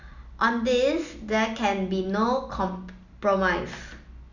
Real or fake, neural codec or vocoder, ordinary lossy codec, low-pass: real; none; none; 7.2 kHz